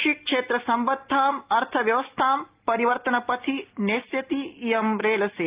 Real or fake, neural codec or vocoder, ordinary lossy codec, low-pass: real; none; Opus, 32 kbps; 3.6 kHz